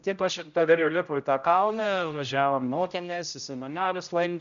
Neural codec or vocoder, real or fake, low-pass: codec, 16 kHz, 0.5 kbps, X-Codec, HuBERT features, trained on general audio; fake; 7.2 kHz